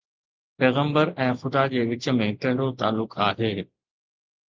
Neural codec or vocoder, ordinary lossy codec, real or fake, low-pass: none; Opus, 24 kbps; real; 7.2 kHz